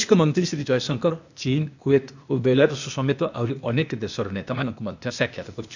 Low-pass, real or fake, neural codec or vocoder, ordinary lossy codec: 7.2 kHz; fake; codec, 16 kHz, 0.8 kbps, ZipCodec; none